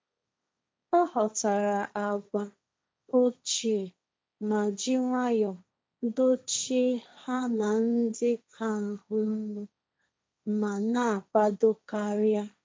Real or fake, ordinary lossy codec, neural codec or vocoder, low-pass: fake; none; codec, 16 kHz, 1.1 kbps, Voila-Tokenizer; none